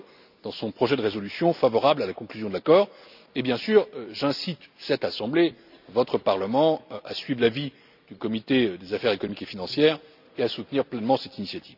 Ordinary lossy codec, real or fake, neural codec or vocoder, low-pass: none; real; none; 5.4 kHz